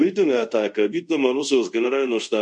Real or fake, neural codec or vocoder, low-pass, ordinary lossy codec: fake; codec, 24 kHz, 0.5 kbps, DualCodec; 10.8 kHz; MP3, 48 kbps